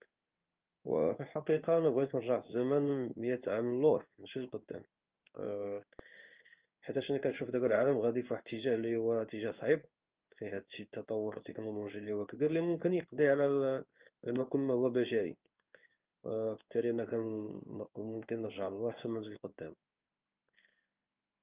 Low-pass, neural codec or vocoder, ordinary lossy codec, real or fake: 3.6 kHz; codec, 16 kHz in and 24 kHz out, 1 kbps, XY-Tokenizer; Opus, 24 kbps; fake